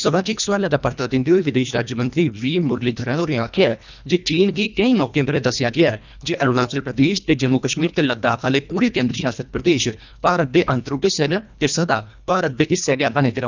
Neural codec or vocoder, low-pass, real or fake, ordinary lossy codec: codec, 24 kHz, 1.5 kbps, HILCodec; 7.2 kHz; fake; none